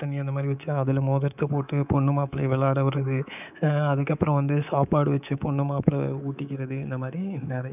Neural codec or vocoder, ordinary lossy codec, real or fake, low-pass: codec, 24 kHz, 3.1 kbps, DualCodec; none; fake; 3.6 kHz